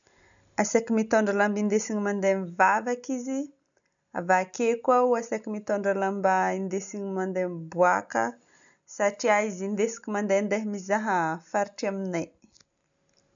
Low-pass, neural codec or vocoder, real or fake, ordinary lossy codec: 7.2 kHz; none; real; none